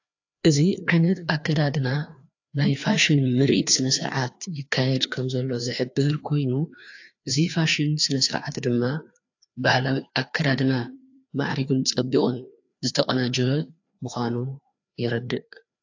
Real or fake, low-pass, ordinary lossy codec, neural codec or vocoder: fake; 7.2 kHz; AAC, 48 kbps; codec, 16 kHz, 2 kbps, FreqCodec, larger model